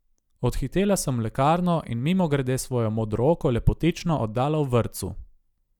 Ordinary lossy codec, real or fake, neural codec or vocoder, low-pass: none; real; none; 19.8 kHz